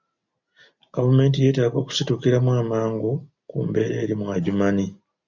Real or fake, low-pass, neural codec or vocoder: real; 7.2 kHz; none